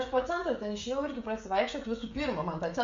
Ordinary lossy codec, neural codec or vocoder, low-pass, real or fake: MP3, 48 kbps; codec, 16 kHz, 16 kbps, FreqCodec, smaller model; 7.2 kHz; fake